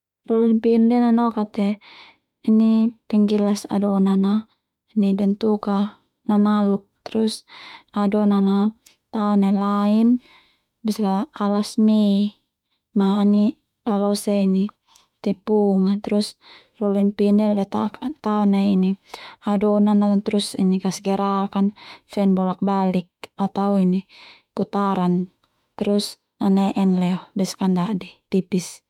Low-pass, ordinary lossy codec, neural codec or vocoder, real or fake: 19.8 kHz; MP3, 96 kbps; autoencoder, 48 kHz, 32 numbers a frame, DAC-VAE, trained on Japanese speech; fake